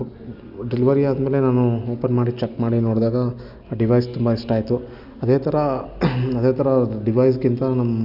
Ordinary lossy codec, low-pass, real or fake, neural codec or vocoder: none; 5.4 kHz; real; none